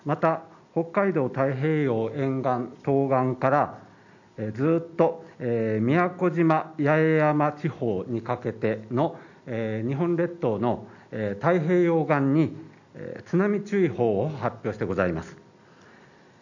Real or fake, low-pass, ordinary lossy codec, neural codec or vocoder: real; 7.2 kHz; none; none